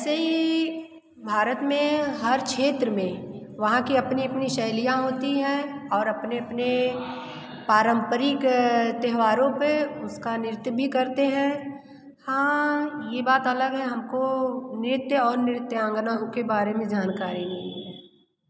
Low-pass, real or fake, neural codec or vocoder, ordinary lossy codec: none; real; none; none